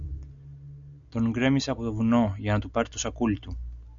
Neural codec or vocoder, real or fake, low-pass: none; real; 7.2 kHz